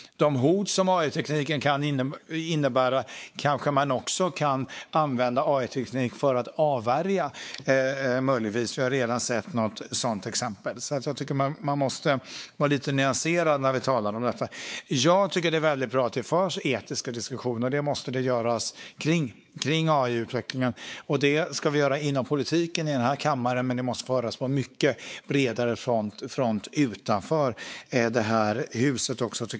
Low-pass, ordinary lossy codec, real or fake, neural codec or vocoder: none; none; fake; codec, 16 kHz, 4 kbps, X-Codec, WavLM features, trained on Multilingual LibriSpeech